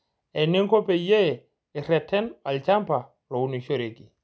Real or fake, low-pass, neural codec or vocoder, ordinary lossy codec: real; none; none; none